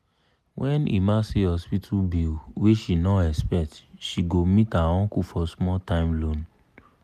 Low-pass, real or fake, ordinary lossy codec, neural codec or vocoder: 14.4 kHz; real; MP3, 96 kbps; none